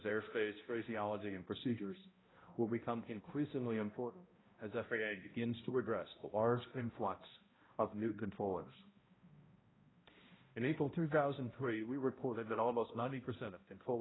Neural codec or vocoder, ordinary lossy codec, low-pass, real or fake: codec, 16 kHz, 0.5 kbps, X-Codec, HuBERT features, trained on balanced general audio; AAC, 16 kbps; 7.2 kHz; fake